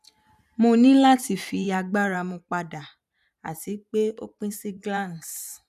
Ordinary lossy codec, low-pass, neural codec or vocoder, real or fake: none; 14.4 kHz; vocoder, 44.1 kHz, 128 mel bands every 512 samples, BigVGAN v2; fake